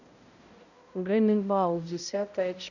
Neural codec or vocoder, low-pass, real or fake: codec, 16 kHz, 0.5 kbps, X-Codec, HuBERT features, trained on balanced general audio; 7.2 kHz; fake